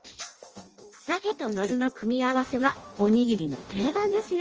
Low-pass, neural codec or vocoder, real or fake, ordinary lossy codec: 7.2 kHz; codec, 16 kHz in and 24 kHz out, 0.6 kbps, FireRedTTS-2 codec; fake; Opus, 24 kbps